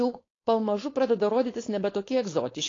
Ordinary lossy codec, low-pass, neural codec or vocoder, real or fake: AAC, 32 kbps; 7.2 kHz; codec, 16 kHz, 4.8 kbps, FACodec; fake